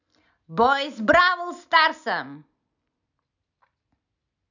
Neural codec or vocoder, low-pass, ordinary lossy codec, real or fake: none; 7.2 kHz; none; real